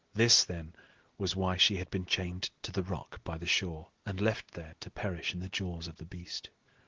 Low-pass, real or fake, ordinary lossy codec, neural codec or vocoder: 7.2 kHz; real; Opus, 16 kbps; none